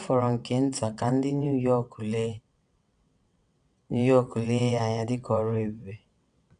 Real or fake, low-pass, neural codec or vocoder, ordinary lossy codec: fake; 9.9 kHz; vocoder, 22.05 kHz, 80 mel bands, WaveNeXt; none